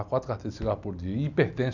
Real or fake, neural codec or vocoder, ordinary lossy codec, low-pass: real; none; none; 7.2 kHz